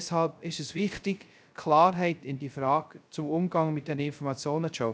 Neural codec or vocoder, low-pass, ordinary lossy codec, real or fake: codec, 16 kHz, 0.3 kbps, FocalCodec; none; none; fake